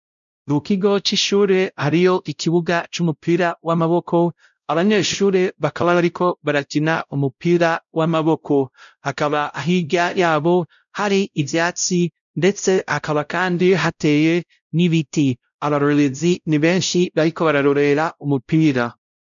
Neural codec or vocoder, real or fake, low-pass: codec, 16 kHz, 0.5 kbps, X-Codec, WavLM features, trained on Multilingual LibriSpeech; fake; 7.2 kHz